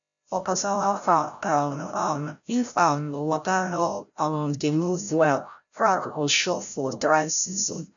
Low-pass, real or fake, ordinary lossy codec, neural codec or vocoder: 7.2 kHz; fake; none; codec, 16 kHz, 0.5 kbps, FreqCodec, larger model